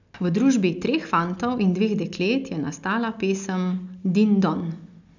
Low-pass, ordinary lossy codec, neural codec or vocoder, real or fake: 7.2 kHz; none; none; real